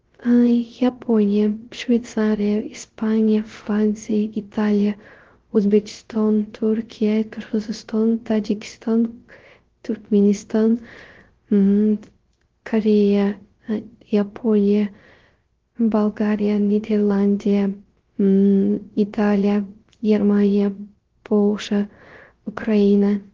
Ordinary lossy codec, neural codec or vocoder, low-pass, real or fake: Opus, 16 kbps; codec, 16 kHz, 0.3 kbps, FocalCodec; 7.2 kHz; fake